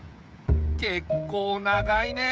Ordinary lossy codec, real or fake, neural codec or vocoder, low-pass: none; fake; codec, 16 kHz, 16 kbps, FreqCodec, smaller model; none